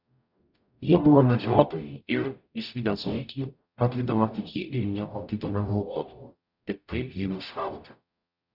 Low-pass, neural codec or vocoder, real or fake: 5.4 kHz; codec, 44.1 kHz, 0.9 kbps, DAC; fake